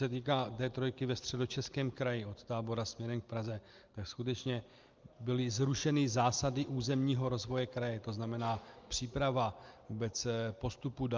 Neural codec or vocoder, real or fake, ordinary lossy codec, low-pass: none; real; Opus, 32 kbps; 7.2 kHz